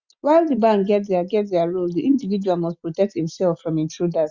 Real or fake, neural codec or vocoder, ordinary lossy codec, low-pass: real; none; Opus, 64 kbps; 7.2 kHz